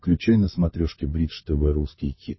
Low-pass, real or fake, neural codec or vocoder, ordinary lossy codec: 7.2 kHz; fake; codec, 16 kHz, 16 kbps, FunCodec, trained on LibriTTS, 50 frames a second; MP3, 24 kbps